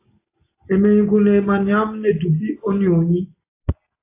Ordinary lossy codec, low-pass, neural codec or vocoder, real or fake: AAC, 24 kbps; 3.6 kHz; none; real